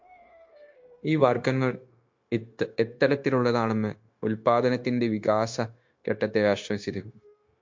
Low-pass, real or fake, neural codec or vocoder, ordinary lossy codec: 7.2 kHz; fake; codec, 16 kHz, 0.9 kbps, LongCat-Audio-Codec; MP3, 48 kbps